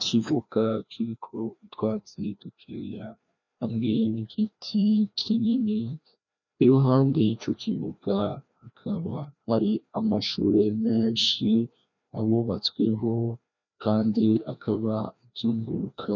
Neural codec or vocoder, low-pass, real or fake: codec, 16 kHz, 1 kbps, FreqCodec, larger model; 7.2 kHz; fake